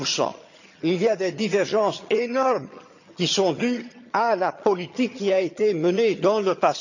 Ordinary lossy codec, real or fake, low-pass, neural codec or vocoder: none; fake; 7.2 kHz; vocoder, 22.05 kHz, 80 mel bands, HiFi-GAN